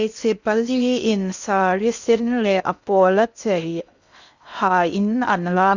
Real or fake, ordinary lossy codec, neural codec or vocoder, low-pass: fake; none; codec, 16 kHz in and 24 kHz out, 0.6 kbps, FocalCodec, streaming, 2048 codes; 7.2 kHz